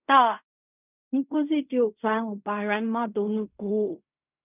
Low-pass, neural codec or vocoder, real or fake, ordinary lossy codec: 3.6 kHz; codec, 16 kHz in and 24 kHz out, 0.4 kbps, LongCat-Audio-Codec, fine tuned four codebook decoder; fake; none